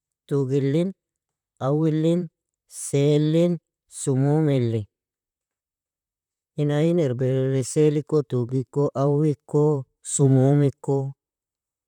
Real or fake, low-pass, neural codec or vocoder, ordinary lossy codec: fake; 19.8 kHz; vocoder, 44.1 kHz, 128 mel bands, Pupu-Vocoder; none